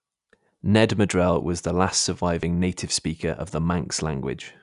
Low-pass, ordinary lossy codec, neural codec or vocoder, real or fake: 10.8 kHz; none; none; real